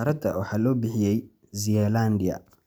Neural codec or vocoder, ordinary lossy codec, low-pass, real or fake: none; none; none; real